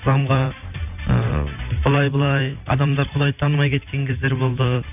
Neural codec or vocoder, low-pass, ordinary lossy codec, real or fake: vocoder, 44.1 kHz, 128 mel bands every 512 samples, BigVGAN v2; 3.6 kHz; none; fake